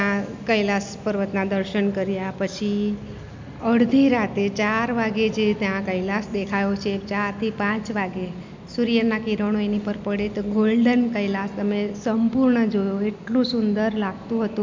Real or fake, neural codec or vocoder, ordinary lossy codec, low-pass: real; none; MP3, 64 kbps; 7.2 kHz